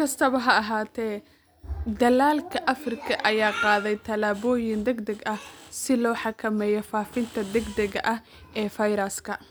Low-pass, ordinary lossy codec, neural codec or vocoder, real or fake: none; none; none; real